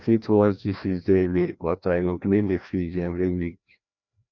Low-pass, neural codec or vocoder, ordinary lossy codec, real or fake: 7.2 kHz; codec, 16 kHz, 1 kbps, FreqCodec, larger model; none; fake